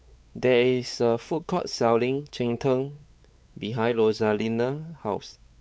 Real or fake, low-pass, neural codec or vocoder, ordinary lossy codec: fake; none; codec, 16 kHz, 4 kbps, X-Codec, WavLM features, trained on Multilingual LibriSpeech; none